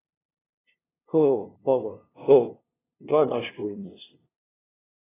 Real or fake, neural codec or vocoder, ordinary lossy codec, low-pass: fake; codec, 16 kHz, 0.5 kbps, FunCodec, trained on LibriTTS, 25 frames a second; AAC, 16 kbps; 3.6 kHz